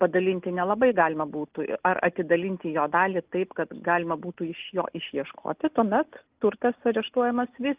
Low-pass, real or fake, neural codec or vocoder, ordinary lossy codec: 3.6 kHz; real; none; Opus, 24 kbps